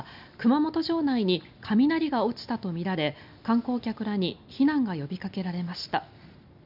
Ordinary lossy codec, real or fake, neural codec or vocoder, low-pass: none; real; none; 5.4 kHz